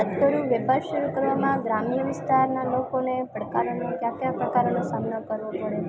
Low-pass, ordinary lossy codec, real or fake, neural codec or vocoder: none; none; real; none